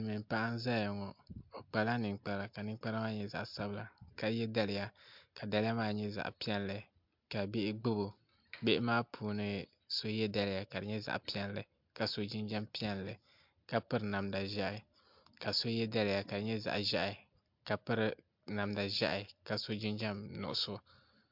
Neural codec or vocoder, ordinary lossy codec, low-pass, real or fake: none; MP3, 48 kbps; 5.4 kHz; real